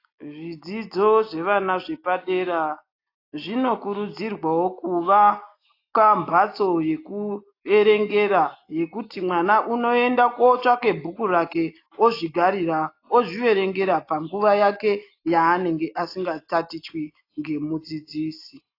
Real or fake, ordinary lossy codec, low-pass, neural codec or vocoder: real; AAC, 32 kbps; 5.4 kHz; none